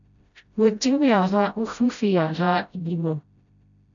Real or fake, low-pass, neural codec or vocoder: fake; 7.2 kHz; codec, 16 kHz, 0.5 kbps, FreqCodec, smaller model